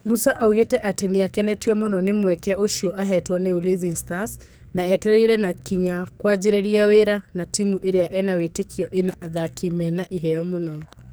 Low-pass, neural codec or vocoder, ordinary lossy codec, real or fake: none; codec, 44.1 kHz, 2.6 kbps, SNAC; none; fake